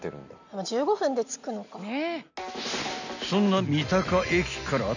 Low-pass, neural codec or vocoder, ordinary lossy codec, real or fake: 7.2 kHz; none; none; real